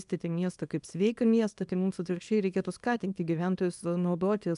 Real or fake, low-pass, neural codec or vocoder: fake; 10.8 kHz; codec, 24 kHz, 0.9 kbps, WavTokenizer, small release